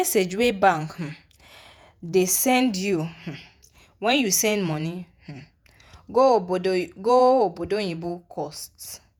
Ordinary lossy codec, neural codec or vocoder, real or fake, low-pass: none; vocoder, 48 kHz, 128 mel bands, Vocos; fake; none